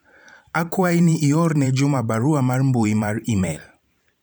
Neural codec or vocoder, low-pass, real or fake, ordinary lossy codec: vocoder, 44.1 kHz, 128 mel bands every 512 samples, BigVGAN v2; none; fake; none